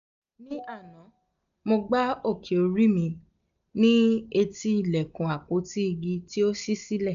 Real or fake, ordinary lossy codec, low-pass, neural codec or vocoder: real; none; 7.2 kHz; none